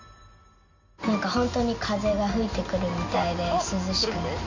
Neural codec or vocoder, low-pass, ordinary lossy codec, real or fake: none; 7.2 kHz; none; real